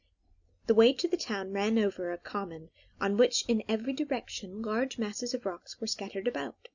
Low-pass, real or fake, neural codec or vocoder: 7.2 kHz; real; none